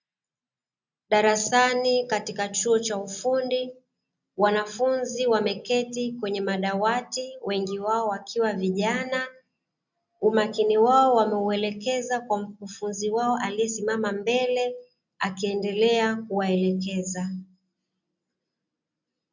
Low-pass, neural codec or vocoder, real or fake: 7.2 kHz; none; real